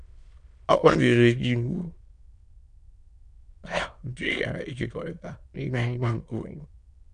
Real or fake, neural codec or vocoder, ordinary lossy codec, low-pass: fake; autoencoder, 22.05 kHz, a latent of 192 numbers a frame, VITS, trained on many speakers; MP3, 64 kbps; 9.9 kHz